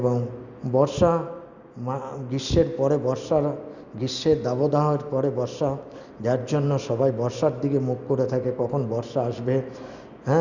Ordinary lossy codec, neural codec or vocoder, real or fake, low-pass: Opus, 64 kbps; none; real; 7.2 kHz